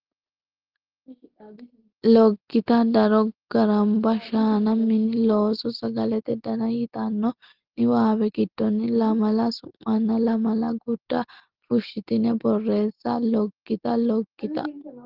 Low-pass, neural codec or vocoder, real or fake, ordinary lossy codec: 5.4 kHz; none; real; Opus, 16 kbps